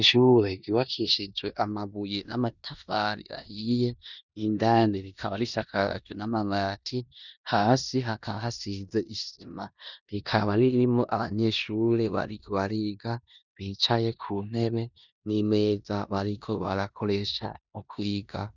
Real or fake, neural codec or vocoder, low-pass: fake; codec, 16 kHz in and 24 kHz out, 0.9 kbps, LongCat-Audio-Codec, four codebook decoder; 7.2 kHz